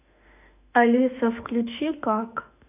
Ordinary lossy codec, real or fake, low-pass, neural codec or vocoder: none; fake; 3.6 kHz; autoencoder, 48 kHz, 32 numbers a frame, DAC-VAE, trained on Japanese speech